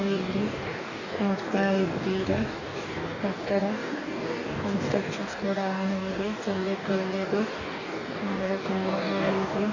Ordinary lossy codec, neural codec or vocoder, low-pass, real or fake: none; codec, 44.1 kHz, 2.6 kbps, DAC; 7.2 kHz; fake